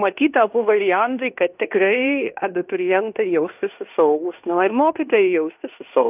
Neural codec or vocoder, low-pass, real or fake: codec, 16 kHz in and 24 kHz out, 0.9 kbps, LongCat-Audio-Codec, fine tuned four codebook decoder; 3.6 kHz; fake